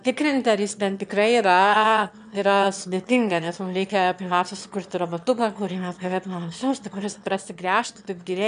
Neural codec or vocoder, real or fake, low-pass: autoencoder, 22.05 kHz, a latent of 192 numbers a frame, VITS, trained on one speaker; fake; 9.9 kHz